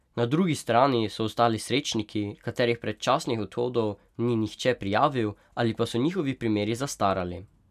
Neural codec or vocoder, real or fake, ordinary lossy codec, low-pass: none; real; none; 14.4 kHz